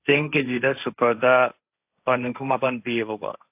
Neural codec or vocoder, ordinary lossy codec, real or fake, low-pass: codec, 16 kHz, 1.1 kbps, Voila-Tokenizer; AAC, 32 kbps; fake; 3.6 kHz